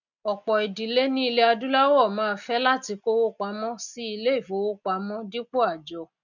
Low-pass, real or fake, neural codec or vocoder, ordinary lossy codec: 7.2 kHz; real; none; none